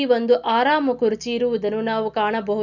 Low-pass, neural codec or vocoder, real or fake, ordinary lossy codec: 7.2 kHz; none; real; none